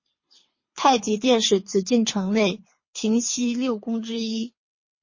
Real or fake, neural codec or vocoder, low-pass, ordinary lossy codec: fake; codec, 24 kHz, 3 kbps, HILCodec; 7.2 kHz; MP3, 32 kbps